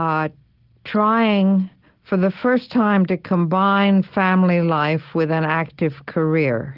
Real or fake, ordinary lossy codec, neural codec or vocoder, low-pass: real; Opus, 16 kbps; none; 5.4 kHz